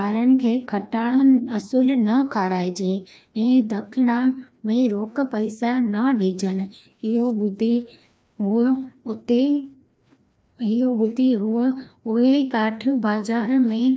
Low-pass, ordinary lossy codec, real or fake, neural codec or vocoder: none; none; fake; codec, 16 kHz, 1 kbps, FreqCodec, larger model